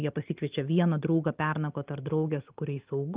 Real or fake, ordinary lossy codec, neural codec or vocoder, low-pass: real; Opus, 32 kbps; none; 3.6 kHz